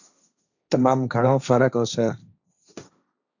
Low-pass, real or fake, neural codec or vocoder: 7.2 kHz; fake; codec, 16 kHz, 1.1 kbps, Voila-Tokenizer